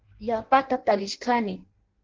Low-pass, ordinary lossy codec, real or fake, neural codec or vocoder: 7.2 kHz; Opus, 16 kbps; fake; codec, 16 kHz in and 24 kHz out, 0.6 kbps, FireRedTTS-2 codec